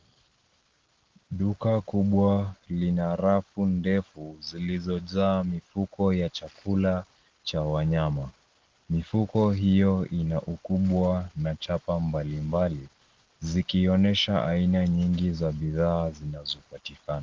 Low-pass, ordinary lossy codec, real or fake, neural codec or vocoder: 7.2 kHz; Opus, 16 kbps; real; none